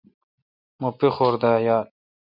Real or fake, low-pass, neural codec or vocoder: real; 5.4 kHz; none